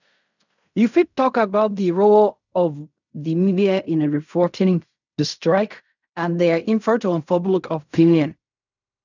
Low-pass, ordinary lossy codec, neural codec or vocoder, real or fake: 7.2 kHz; none; codec, 16 kHz in and 24 kHz out, 0.4 kbps, LongCat-Audio-Codec, fine tuned four codebook decoder; fake